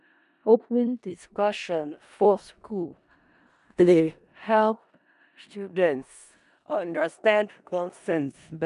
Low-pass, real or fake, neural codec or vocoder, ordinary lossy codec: 10.8 kHz; fake; codec, 16 kHz in and 24 kHz out, 0.4 kbps, LongCat-Audio-Codec, four codebook decoder; none